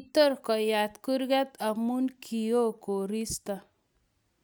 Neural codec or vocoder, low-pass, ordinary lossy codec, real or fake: none; none; none; real